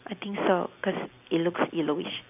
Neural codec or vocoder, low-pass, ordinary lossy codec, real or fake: none; 3.6 kHz; none; real